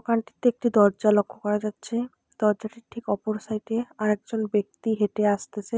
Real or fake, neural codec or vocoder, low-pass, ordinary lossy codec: real; none; none; none